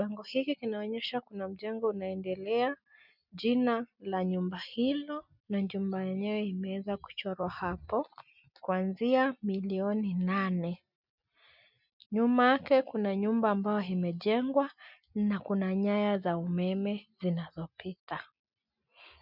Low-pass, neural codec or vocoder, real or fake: 5.4 kHz; none; real